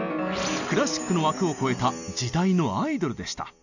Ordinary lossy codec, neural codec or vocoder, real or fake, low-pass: none; none; real; 7.2 kHz